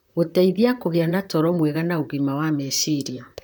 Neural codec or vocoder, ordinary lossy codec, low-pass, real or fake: vocoder, 44.1 kHz, 128 mel bands, Pupu-Vocoder; none; none; fake